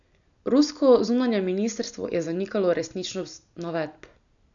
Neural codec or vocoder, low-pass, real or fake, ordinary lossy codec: none; 7.2 kHz; real; none